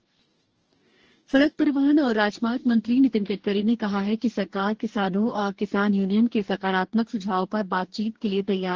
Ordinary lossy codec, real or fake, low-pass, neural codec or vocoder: Opus, 16 kbps; fake; 7.2 kHz; codec, 44.1 kHz, 2.6 kbps, DAC